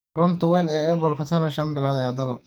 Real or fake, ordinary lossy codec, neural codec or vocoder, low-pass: fake; none; codec, 44.1 kHz, 2.6 kbps, SNAC; none